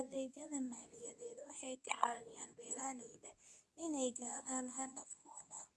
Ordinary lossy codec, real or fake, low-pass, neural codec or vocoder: none; fake; none; codec, 24 kHz, 0.9 kbps, WavTokenizer, medium speech release version 2